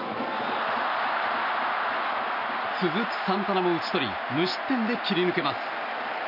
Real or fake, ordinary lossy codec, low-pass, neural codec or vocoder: real; none; 5.4 kHz; none